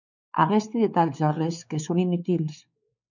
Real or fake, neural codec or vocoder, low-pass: fake; codec, 16 kHz, 4 kbps, X-Codec, WavLM features, trained on Multilingual LibriSpeech; 7.2 kHz